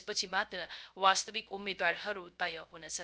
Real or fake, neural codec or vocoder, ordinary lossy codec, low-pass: fake; codec, 16 kHz, 0.3 kbps, FocalCodec; none; none